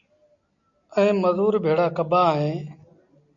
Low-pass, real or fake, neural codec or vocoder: 7.2 kHz; real; none